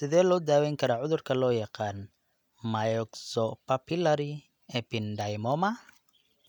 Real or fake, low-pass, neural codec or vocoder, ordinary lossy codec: real; 19.8 kHz; none; none